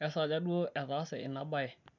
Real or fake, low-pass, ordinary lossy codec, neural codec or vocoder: real; 7.2 kHz; none; none